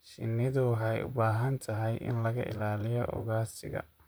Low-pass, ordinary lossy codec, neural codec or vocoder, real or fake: none; none; vocoder, 44.1 kHz, 128 mel bands, Pupu-Vocoder; fake